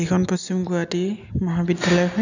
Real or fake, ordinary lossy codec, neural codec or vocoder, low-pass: real; none; none; 7.2 kHz